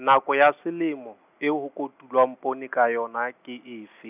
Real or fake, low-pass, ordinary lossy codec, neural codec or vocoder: real; 3.6 kHz; none; none